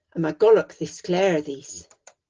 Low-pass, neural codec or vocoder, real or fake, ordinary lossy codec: 7.2 kHz; none; real; Opus, 16 kbps